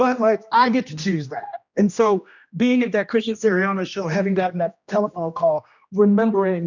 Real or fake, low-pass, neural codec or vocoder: fake; 7.2 kHz; codec, 16 kHz, 1 kbps, X-Codec, HuBERT features, trained on general audio